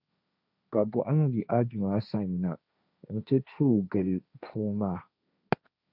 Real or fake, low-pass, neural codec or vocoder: fake; 5.4 kHz; codec, 16 kHz, 1.1 kbps, Voila-Tokenizer